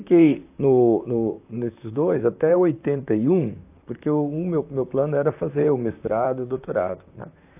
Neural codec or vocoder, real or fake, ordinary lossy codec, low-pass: vocoder, 44.1 kHz, 128 mel bands, Pupu-Vocoder; fake; AAC, 32 kbps; 3.6 kHz